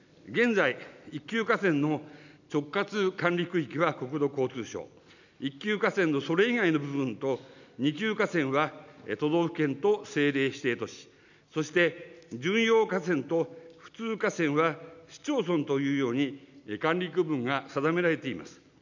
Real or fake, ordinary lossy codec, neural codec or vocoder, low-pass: real; none; none; 7.2 kHz